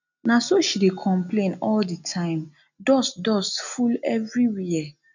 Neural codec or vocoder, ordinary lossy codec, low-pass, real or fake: none; none; 7.2 kHz; real